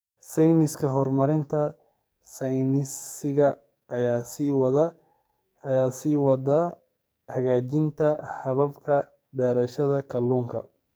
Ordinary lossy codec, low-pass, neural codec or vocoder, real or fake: none; none; codec, 44.1 kHz, 2.6 kbps, SNAC; fake